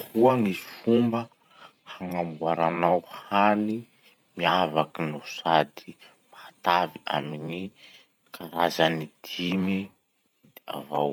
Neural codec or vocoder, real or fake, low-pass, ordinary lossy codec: vocoder, 44.1 kHz, 128 mel bands every 512 samples, BigVGAN v2; fake; 19.8 kHz; none